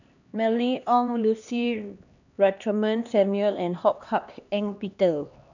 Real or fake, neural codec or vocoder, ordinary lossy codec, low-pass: fake; codec, 16 kHz, 2 kbps, X-Codec, HuBERT features, trained on LibriSpeech; none; 7.2 kHz